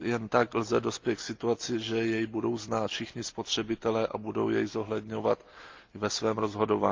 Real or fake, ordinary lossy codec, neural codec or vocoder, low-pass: real; Opus, 32 kbps; none; 7.2 kHz